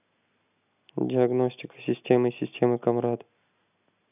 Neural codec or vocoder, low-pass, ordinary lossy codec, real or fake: none; 3.6 kHz; none; real